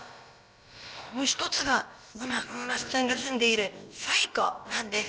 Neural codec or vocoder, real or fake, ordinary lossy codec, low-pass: codec, 16 kHz, about 1 kbps, DyCAST, with the encoder's durations; fake; none; none